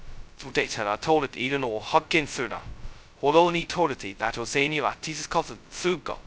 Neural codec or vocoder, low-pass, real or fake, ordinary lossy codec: codec, 16 kHz, 0.2 kbps, FocalCodec; none; fake; none